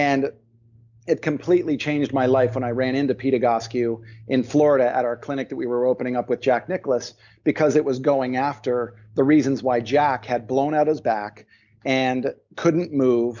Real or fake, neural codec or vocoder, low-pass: real; none; 7.2 kHz